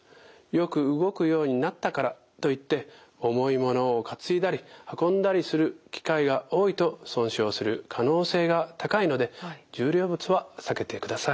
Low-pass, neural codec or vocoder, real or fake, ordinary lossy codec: none; none; real; none